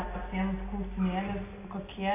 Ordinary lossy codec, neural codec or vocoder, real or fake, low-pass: AAC, 24 kbps; none; real; 3.6 kHz